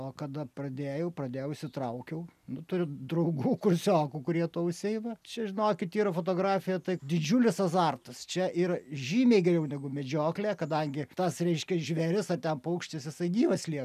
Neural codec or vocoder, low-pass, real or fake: none; 14.4 kHz; real